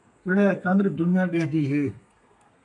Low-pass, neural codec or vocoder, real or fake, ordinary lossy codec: 10.8 kHz; codec, 32 kHz, 1.9 kbps, SNAC; fake; MP3, 96 kbps